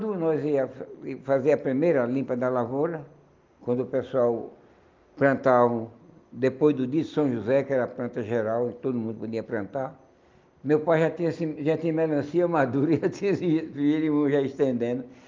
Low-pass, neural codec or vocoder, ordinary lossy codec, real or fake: 7.2 kHz; none; Opus, 32 kbps; real